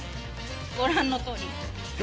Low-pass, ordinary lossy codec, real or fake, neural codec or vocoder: none; none; real; none